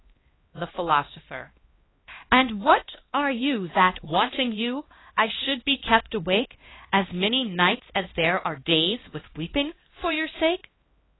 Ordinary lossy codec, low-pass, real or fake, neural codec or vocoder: AAC, 16 kbps; 7.2 kHz; fake; codec, 16 kHz, 1 kbps, X-Codec, HuBERT features, trained on LibriSpeech